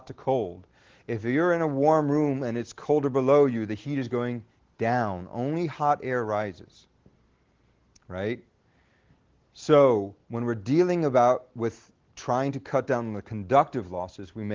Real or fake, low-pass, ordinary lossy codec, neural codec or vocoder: real; 7.2 kHz; Opus, 16 kbps; none